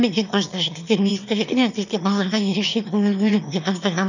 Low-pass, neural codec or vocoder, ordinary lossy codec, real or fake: 7.2 kHz; autoencoder, 22.05 kHz, a latent of 192 numbers a frame, VITS, trained on one speaker; none; fake